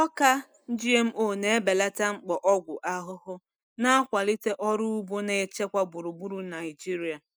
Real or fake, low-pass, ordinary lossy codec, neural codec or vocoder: real; none; none; none